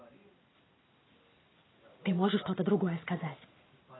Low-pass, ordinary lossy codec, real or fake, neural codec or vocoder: 7.2 kHz; AAC, 16 kbps; real; none